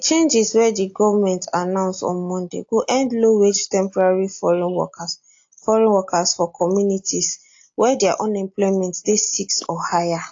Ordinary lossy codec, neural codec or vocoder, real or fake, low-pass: AAC, 48 kbps; none; real; 7.2 kHz